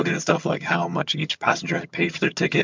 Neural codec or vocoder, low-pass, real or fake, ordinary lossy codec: vocoder, 22.05 kHz, 80 mel bands, HiFi-GAN; 7.2 kHz; fake; MP3, 64 kbps